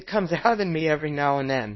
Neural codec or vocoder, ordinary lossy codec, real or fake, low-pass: codec, 24 kHz, 0.9 kbps, WavTokenizer, small release; MP3, 24 kbps; fake; 7.2 kHz